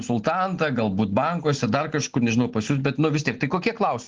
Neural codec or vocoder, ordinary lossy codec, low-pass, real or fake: none; Opus, 16 kbps; 7.2 kHz; real